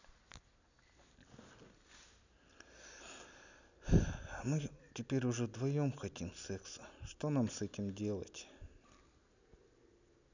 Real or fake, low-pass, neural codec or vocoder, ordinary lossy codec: real; 7.2 kHz; none; none